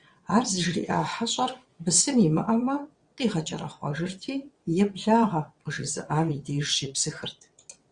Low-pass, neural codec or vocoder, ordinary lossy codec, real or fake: 9.9 kHz; vocoder, 22.05 kHz, 80 mel bands, WaveNeXt; Opus, 64 kbps; fake